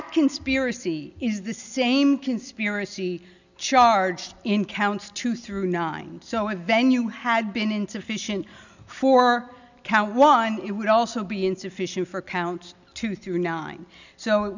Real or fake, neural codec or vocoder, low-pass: real; none; 7.2 kHz